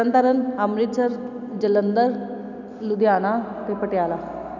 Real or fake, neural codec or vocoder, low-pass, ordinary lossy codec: real; none; 7.2 kHz; none